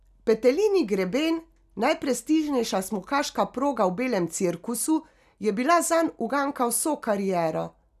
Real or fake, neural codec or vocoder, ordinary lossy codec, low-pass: real; none; none; 14.4 kHz